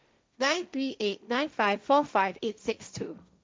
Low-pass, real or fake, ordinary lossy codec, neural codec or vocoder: none; fake; none; codec, 16 kHz, 1.1 kbps, Voila-Tokenizer